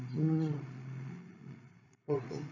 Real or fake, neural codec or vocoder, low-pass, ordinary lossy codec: fake; codec, 16 kHz, 16 kbps, FreqCodec, larger model; 7.2 kHz; none